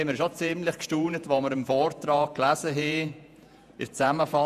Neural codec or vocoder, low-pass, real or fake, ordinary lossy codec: vocoder, 48 kHz, 128 mel bands, Vocos; 14.4 kHz; fake; none